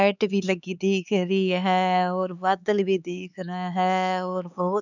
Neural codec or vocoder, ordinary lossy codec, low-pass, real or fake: codec, 16 kHz, 4 kbps, X-Codec, HuBERT features, trained on LibriSpeech; none; 7.2 kHz; fake